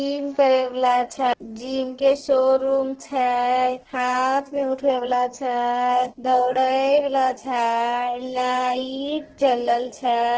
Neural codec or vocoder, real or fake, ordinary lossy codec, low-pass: codec, 32 kHz, 1.9 kbps, SNAC; fake; Opus, 16 kbps; 7.2 kHz